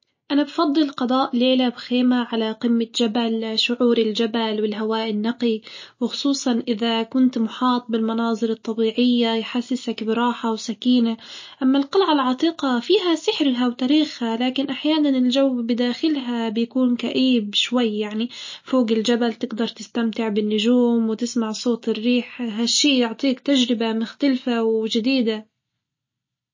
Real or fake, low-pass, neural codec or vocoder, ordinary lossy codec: real; 7.2 kHz; none; MP3, 32 kbps